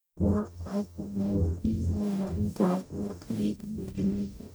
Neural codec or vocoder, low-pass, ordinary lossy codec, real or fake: codec, 44.1 kHz, 0.9 kbps, DAC; none; none; fake